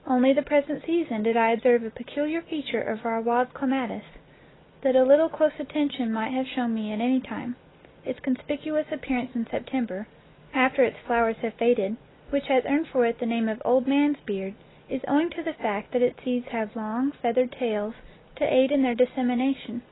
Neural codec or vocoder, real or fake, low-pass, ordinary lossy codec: none; real; 7.2 kHz; AAC, 16 kbps